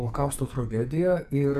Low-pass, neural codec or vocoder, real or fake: 14.4 kHz; codec, 44.1 kHz, 2.6 kbps, SNAC; fake